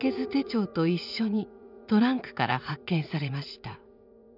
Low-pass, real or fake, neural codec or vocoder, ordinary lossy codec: 5.4 kHz; fake; vocoder, 44.1 kHz, 80 mel bands, Vocos; none